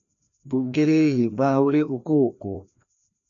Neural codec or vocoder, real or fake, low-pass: codec, 16 kHz, 1 kbps, FreqCodec, larger model; fake; 7.2 kHz